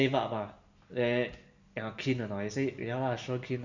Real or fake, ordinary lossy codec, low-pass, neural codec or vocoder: real; none; 7.2 kHz; none